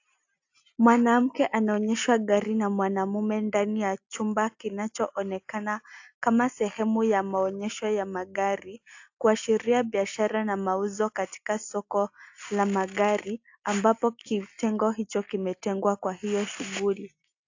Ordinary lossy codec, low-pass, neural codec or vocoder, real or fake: AAC, 48 kbps; 7.2 kHz; none; real